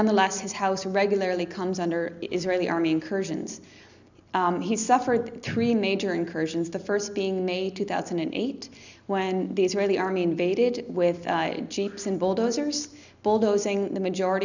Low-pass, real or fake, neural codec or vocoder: 7.2 kHz; real; none